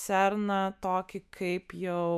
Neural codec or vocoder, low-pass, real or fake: autoencoder, 48 kHz, 128 numbers a frame, DAC-VAE, trained on Japanese speech; 14.4 kHz; fake